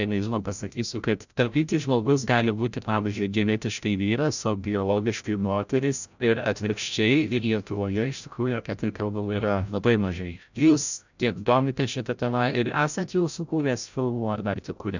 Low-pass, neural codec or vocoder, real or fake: 7.2 kHz; codec, 16 kHz, 0.5 kbps, FreqCodec, larger model; fake